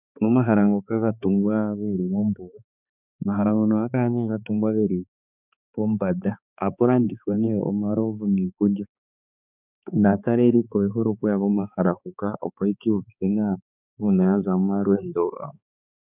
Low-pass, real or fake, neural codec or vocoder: 3.6 kHz; fake; codec, 16 kHz, 4 kbps, X-Codec, HuBERT features, trained on balanced general audio